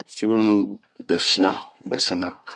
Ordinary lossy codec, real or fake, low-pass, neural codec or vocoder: MP3, 96 kbps; fake; 10.8 kHz; codec, 24 kHz, 1 kbps, SNAC